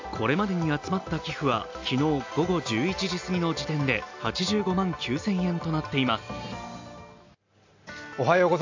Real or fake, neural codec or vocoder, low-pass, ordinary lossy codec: real; none; 7.2 kHz; AAC, 48 kbps